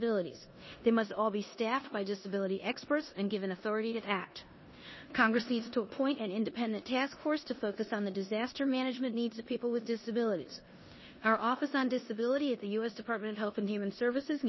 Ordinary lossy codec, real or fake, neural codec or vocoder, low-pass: MP3, 24 kbps; fake; codec, 16 kHz in and 24 kHz out, 0.9 kbps, LongCat-Audio-Codec, four codebook decoder; 7.2 kHz